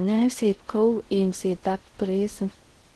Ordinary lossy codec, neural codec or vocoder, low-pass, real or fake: Opus, 16 kbps; codec, 16 kHz in and 24 kHz out, 0.6 kbps, FocalCodec, streaming, 4096 codes; 10.8 kHz; fake